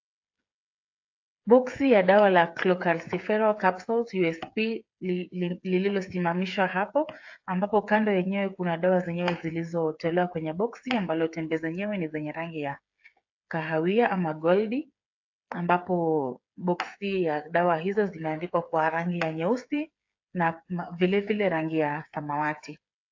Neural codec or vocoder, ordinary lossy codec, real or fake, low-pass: codec, 16 kHz, 8 kbps, FreqCodec, smaller model; AAC, 48 kbps; fake; 7.2 kHz